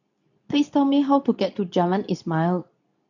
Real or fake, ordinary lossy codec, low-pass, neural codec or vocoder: fake; none; 7.2 kHz; codec, 24 kHz, 0.9 kbps, WavTokenizer, medium speech release version 2